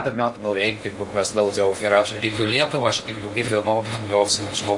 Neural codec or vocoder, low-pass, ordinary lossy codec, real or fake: codec, 16 kHz in and 24 kHz out, 0.6 kbps, FocalCodec, streaming, 2048 codes; 10.8 kHz; MP3, 48 kbps; fake